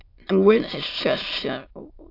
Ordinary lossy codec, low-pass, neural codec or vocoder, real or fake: AAC, 32 kbps; 5.4 kHz; autoencoder, 22.05 kHz, a latent of 192 numbers a frame, VITS, trained on many speakers; fake